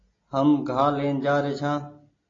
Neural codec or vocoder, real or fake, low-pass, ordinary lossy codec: none; real; 7.2 kHz; AAC, 32 kbps